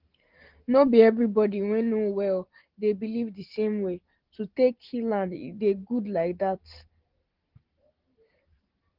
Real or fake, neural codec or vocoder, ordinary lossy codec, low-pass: real; none; Opus, 16 kbps; 5.4 kHz